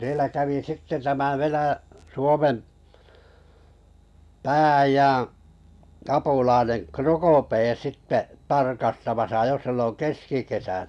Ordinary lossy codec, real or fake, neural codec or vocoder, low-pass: none; real; none; none